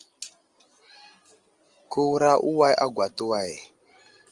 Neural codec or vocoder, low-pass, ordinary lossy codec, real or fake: none; 10.8 kHz; Opus, 24 kbps; real